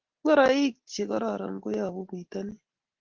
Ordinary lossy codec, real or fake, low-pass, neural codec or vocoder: Opus, 16 kbps; real; 7.2 kHz; none